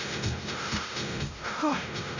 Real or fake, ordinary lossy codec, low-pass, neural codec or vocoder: fake; none; 7.2 kHz; codec, 16 kHz, 0.5 kbps, X-Codec, WavLM features, trained on Multilingual LibriSpeech